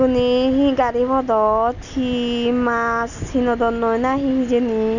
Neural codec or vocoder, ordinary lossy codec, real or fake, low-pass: none; none; real; 7.2 kHz